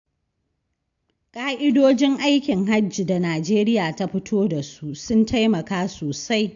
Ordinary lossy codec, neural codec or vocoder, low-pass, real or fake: AAC, 64 kbps; none; 7.2 kHz; real